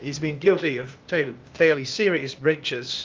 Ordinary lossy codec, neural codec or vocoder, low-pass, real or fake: Opus, 32 kbps; codec, 16 kHz, 0.8 kbps, ZipCodec; 7.2 kHz; fake